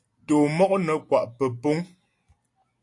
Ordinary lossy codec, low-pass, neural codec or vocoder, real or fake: AAC, 48 kbps; 10.8 kHz; none; real